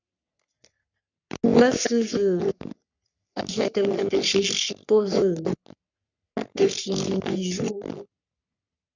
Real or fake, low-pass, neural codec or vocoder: fake; 7.2 kHz; codec, 44.1 kHz, 3.4 kbps, Pupu-Codec